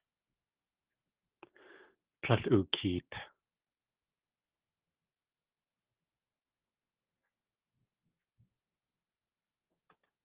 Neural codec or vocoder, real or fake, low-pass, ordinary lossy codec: none; real; 3.6 kHz; Opus, 16 kbps